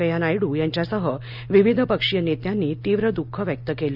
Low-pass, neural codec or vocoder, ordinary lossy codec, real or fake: 5.4 kHz; none; none; real